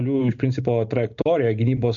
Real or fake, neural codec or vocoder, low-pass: real; none; 7.2 kHz